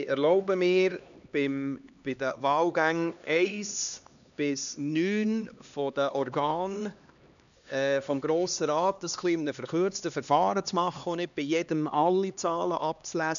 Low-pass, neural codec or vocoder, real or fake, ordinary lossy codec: 7.2 kHz; codec, 16 kHz, 2 kbps, X-Codec, HuBERT features, trained on LibriSpeech; fake; none